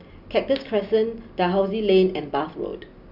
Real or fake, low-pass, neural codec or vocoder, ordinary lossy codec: real; 5.4 kHz; none; none